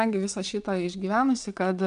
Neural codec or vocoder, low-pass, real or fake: vocoder, 22.05 kHz, 80 mel bands, WaveNeXt; 9.9 kHz; fake